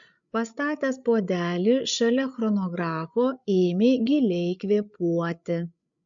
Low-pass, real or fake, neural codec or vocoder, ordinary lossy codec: 7.2 kHz; fake; codec, 16 kHz, 8 kbps, FreqCodec, larger model; MP3, 64 kbps